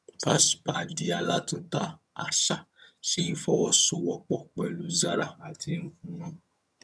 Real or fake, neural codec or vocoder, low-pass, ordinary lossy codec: fake; vocoder, 22.05 kHz, 80 mel bands, HiFi-GAN; none; none